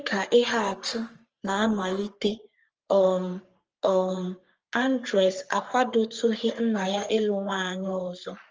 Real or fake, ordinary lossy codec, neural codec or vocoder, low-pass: fake; Opus, 24 kbps; codec, 44.1 kHz, 3.4 kbps, Pupu-Codec; 7.2 kHz